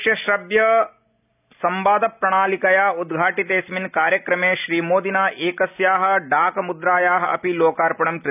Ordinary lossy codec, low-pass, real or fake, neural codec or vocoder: none; 3.6 kHz; real; none